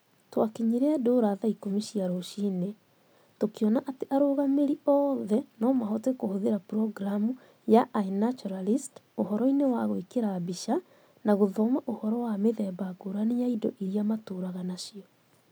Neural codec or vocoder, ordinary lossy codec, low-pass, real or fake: none; none; none; real